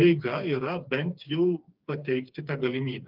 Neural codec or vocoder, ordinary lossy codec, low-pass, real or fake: codec, 16 kHz, 4 kbps, FreqCodec, smaller model; Opus, 32 kbps; 5.4 kHz; fake